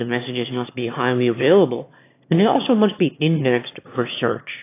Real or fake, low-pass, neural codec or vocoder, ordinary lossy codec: fake; 3.6 kHz; autoencoder, 22.05 kHz, a latent of 192 numbers a frame, VITS, trained on one speaker; AAC, 24 kbps